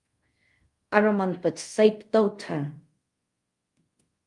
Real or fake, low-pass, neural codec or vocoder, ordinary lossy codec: fake; 10.8 kHz; codec, 24 kHz, 0.5 kbps, DualCodec; Opus, 32 kbps